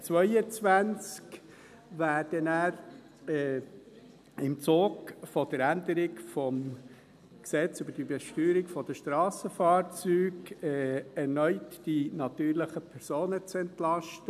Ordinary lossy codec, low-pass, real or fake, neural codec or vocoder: none; 14.4 kHz; real; none